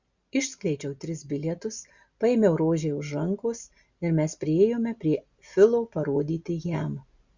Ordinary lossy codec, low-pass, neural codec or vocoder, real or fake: Opus, 64 kbps; 7.2 kHz; none; real